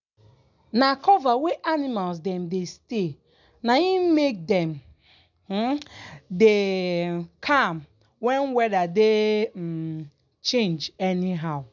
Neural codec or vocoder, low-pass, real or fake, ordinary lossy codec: none; 7.2 kHz; real; none